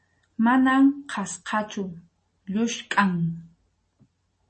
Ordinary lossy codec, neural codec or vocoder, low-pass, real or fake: MP3, 32 kbps; none; 10.8 kHz; real